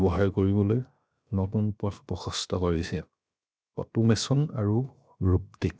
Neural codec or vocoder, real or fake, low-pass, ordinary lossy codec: codec, 16 kHz, 0.7 kbps, FocalCodec; fake; none; none